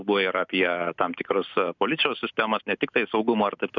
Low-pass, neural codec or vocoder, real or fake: 7.2 kHz; none; real